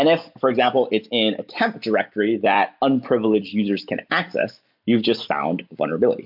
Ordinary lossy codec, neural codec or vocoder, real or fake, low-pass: MP3, 48 kbps; none; real; 5.4 kHz